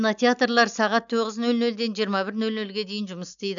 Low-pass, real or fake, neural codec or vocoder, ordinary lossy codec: 7.2 kHz; real; none; none